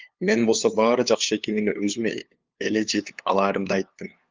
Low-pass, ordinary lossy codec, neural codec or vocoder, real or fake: 7.2 kHz; Opus, 24 kbps; codec, 16 kHz, 2 kbps, FunCodec, trained on LibriTTS, 25 frames a second; fake